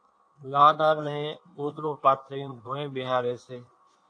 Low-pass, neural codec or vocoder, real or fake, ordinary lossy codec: 9.9 kHz; codec, 16 kHz in and 24 kHz out, 1.1 kbps, FireRedTTS-2 codec; fake; AAC, 48 kbps